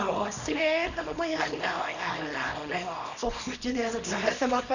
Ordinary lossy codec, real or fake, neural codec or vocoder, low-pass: none; fake; codec, 24 kHz, 0.9 kbps, WavTokenizer, small release; 7.2 kHz